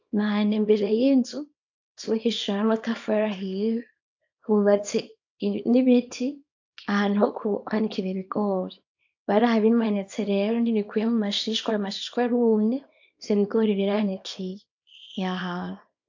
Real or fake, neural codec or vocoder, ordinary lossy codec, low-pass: fake; codec, 24 kHz, 0.9 kbps, WavTokenizer, small release; AAC, 48 kbps; 7.2 kHz